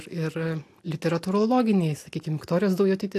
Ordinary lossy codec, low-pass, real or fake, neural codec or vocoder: AAC, 64 kbps; 14.4 kHz; real; none